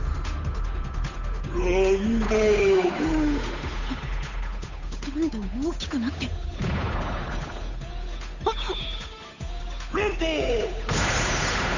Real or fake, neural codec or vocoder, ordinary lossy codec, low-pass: fake; codec, 16 kHz, 8 kbps, FunCodec, trained on Chinese and English, 25 frames a second; none; 7.2 kHz